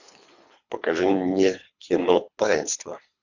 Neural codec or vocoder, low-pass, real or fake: codec, 24 kHz, 3 kbps, HILCodec; 7.2 kHz; fake